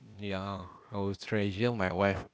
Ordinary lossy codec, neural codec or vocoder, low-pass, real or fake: none; codec, 16 kHz, 0.8 kbps, ZipCodec; none; fake